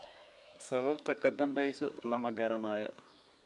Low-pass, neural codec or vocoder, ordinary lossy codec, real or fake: 10.8 kHz; codec, 24 kHz, 1 kbps, SNAC; none; fake